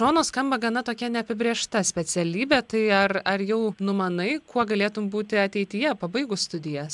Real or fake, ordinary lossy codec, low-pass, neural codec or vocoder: real; MP3, 96 kbps; 10.8 kHz; none